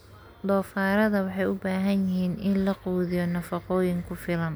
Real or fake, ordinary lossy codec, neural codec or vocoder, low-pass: real; none; none; none